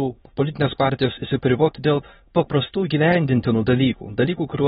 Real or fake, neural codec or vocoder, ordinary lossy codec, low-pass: fake; autoencoder, 22.05 kHz, a latent of 192 numbers a frame, VITS, trained on many speakers; AAC, 16 kbps; 9.9 kHz